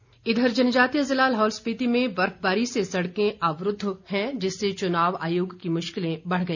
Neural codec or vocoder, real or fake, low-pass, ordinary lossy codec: none; real; 7.2 kHz; none